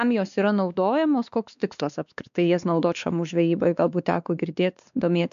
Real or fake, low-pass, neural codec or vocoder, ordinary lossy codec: fake; 7.2 kHz; codec, 16 kHz, 2 kbps, X-Codec, WavLM features, trained on Multilingual LibriSpeech; MP3, 96 kbps